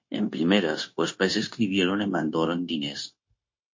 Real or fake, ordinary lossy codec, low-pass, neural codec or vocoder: fake; MP3, 32 kbps; 7.2 kHz; codec, 16 kHz, 0.9 kbps, LongCat-Audio-Codec